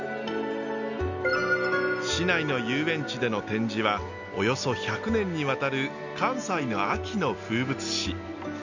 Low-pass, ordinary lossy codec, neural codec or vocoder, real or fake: 7.2 kHz; none; none; real